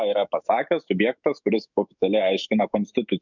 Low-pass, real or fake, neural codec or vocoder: 7.2 kHz; fake; autoencoder, 48 kHz, 128 numbers a frame, DAC-VAE, trained on Japanese speech